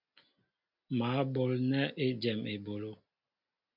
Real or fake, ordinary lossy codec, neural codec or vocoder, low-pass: real; MP3, 48 kbps; none; 5.4 kHz